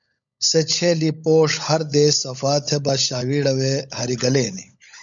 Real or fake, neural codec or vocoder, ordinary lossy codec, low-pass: fake; codec, 16 kHz, 16 kbps, FunCodec, trained on LibriTTS, 50 frames a second; MP3, 96 kbps; 7.2 kHz